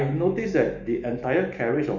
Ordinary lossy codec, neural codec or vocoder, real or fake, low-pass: none; autoencoder, 48 kHz, 128 numbers a frame, DAC-VAE, trained on Japanese speech; fake; 7.2 kHz